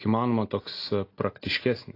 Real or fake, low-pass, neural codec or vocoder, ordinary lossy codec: real; 5.4 kHz; none; AAC, 32 kbps